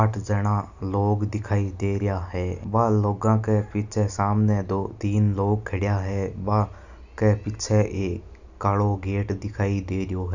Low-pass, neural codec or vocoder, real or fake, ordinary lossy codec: 7.2 kHz; none; real; none